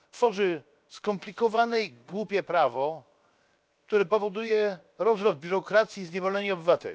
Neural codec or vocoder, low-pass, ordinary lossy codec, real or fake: codec, 16 kHz, 0.7 kbps, FocalCodec; none; none; fake